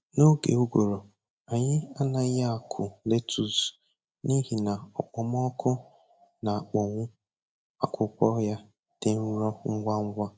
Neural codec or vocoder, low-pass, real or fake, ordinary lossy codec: none; none; real; none